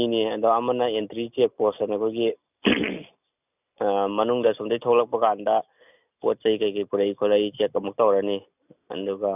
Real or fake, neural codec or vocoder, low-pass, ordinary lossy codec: real; none; 3.6 kHz; none